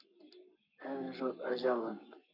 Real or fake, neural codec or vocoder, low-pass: fake; codec, 44.1 kHz, 7.8 kbps, Pupu-Codec; 5.4 kHz